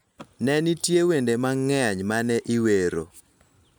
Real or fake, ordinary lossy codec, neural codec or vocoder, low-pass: real; none; none; none